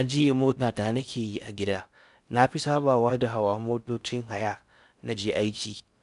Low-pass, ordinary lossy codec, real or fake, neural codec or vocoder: 10.8 kHz; none; fake; codec, 16 kHz in and 24 kHz out, 0.6 kbps, FocalCodec, streaming, 4096 codes